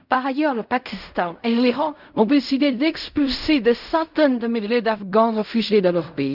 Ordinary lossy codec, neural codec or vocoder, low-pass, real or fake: none; codec, 16 kHz in and 24 kHz out, 0.4 kbps, LongCat-Audio-Codec, fine tuned four codebook decoder; 5.4 kHz; fake